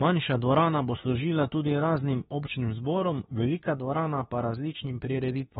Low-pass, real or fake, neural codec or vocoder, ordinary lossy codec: 19.8 kHz; fake; autoencoder, 48 kHz, 32 numbers a frame, DAC-VAE, trained on Japanese speech; AAC, 16 kbps